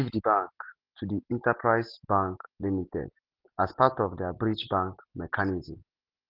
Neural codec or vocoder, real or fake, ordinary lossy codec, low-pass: none; real; Opus, 16 kbps; 5.4 kHz